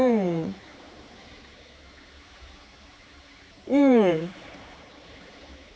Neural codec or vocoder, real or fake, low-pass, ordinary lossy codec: codec, 16 kHz, 4 kbps, X-Codec, HuBERT features, trained on general audio; fake; none; none